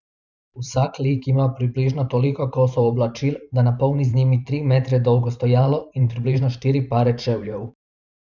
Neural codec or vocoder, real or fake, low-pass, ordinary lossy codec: vocoder, 44.1 kHz, 128 mel bands every 512 samples, BigVGAN v2; fake; 7.2 kHz; none